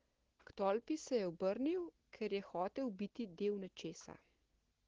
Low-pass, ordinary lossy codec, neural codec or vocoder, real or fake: 7.2 kHz; Opus, 16 kbps; none; real